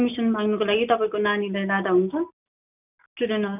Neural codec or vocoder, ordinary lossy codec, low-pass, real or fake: none; none; 3.6 kHz; real